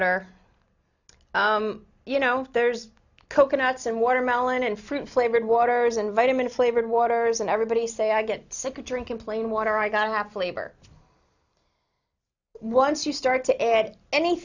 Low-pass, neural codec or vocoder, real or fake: 7.2 kHz; none; real